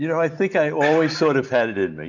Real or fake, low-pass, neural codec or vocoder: real; 7.2 kHz; none